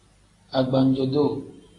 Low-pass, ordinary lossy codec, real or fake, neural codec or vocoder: 10.8 kHz; AAC, 32 kbps; real; none